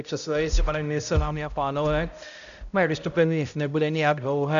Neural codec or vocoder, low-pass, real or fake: codec, 16 kHz, 0.5 kbps, X-Codec, HuBERT features, trained on balanced general audio; 7.2 kHz; fake